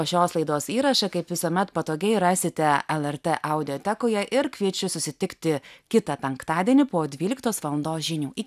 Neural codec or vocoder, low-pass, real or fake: none; 14.4 kHz; real